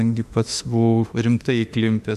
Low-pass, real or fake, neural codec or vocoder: 14.4 kHz; fake; autoencoder, 48 kHz, 32 numbers a frame, DAC-VAE, trained on Japanese speech